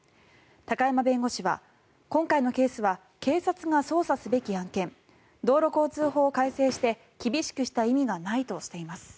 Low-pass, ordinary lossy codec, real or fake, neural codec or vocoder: none; none; real; none